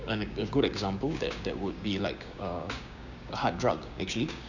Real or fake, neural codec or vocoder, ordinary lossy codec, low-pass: fake; codec, 16 kHz, 6 kbps, DAC; none; 7.2 kHz